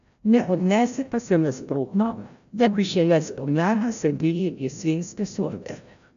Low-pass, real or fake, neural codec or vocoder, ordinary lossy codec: 7.2 kHz; fake; codec, 16 kHz, 0.5 kbps, FreqCodec, larger model; AAC, 96 kbps